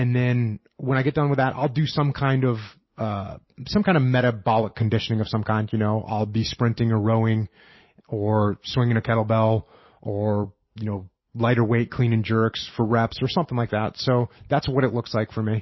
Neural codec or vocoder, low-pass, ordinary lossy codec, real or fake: none; 7.2 kHz; MP3, 24 kbps; real